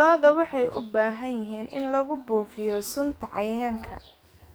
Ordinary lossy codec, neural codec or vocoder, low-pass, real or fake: none; codec, 44.1 kHz, 2.6 kbps, SNAC; none; fake